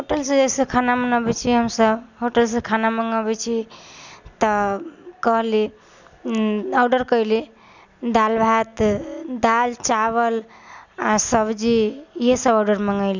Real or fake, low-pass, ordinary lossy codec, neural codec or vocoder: real; 7.2 kHz; none; none